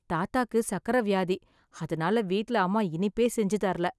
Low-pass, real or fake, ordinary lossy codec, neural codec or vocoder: none; real; none; none